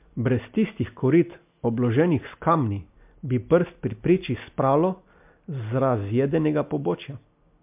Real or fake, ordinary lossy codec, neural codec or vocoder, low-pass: real; MP3, 32 kbps; none; 3.6 kHz